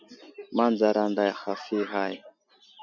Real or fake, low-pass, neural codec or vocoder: real; 7.2 kHz; none